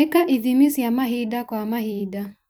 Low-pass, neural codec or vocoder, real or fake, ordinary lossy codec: none; vocoder, 44.1 kHz, 128 mel bands every 256 samples, BigVGAN v2; fake; none